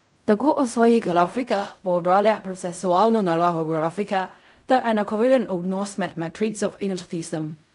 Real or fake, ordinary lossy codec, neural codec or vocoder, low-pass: fake; none; codec, 16 kHz in and 24 kHz out, 0.4 kbps, LongCat-Audio-Codec, fine tuned four codebook decoder; 10.8 kHz